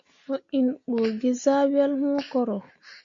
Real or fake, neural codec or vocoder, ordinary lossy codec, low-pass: real; none; AAC, 48 kbps; 7.2 kHz